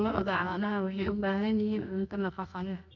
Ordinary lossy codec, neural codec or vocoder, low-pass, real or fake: none; codec, 24 kHz, 0.9 kbps, WavTokenizer, medium music audio release; 7.2 kHz; fake